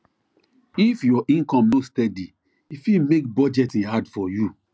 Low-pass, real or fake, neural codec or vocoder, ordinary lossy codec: none; real; none; none